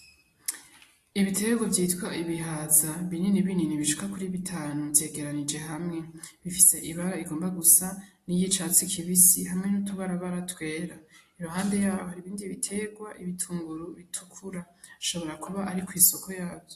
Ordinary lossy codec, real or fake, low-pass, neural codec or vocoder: AAC, 48 kbps; real; 14.4 kHz; none